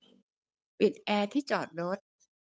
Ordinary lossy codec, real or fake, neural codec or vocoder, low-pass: none; fake; codec, 16 kHz, 8 kbps, FunCodec, trained on Chinese and English, 25 frames a second; none